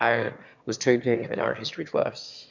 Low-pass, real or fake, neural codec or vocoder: 7.2 kHz; fake; autoencoder, 22.05 kHz, a latent of 192 numbers a frame, VITS, trained on one speaker